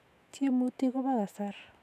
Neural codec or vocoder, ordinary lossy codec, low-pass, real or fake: autoencoder, 48 kHz, 128 numbers a frame, DAC-VAE, trained on Japanese speech; none; 14.4 kHz; fake